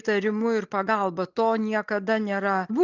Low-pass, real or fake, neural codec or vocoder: 7.2 kHz; real; none